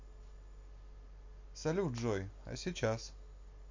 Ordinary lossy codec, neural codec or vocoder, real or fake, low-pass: MP3, 48 kbps; none; real; 7.2 kHz